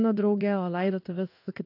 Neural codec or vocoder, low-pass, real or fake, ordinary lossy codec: codec, 16 kHz in and 24 kHz out, 1 kbps, XY-Tokenizer; 5.4 kHz; fake; AAC, 32 kbps